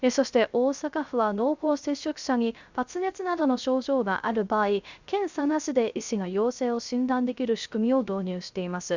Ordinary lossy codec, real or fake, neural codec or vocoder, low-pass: Opus, 64 kbps; fake; codec, 16 kHz, 0.3 kbps, FocalCodec; 7.2 kHz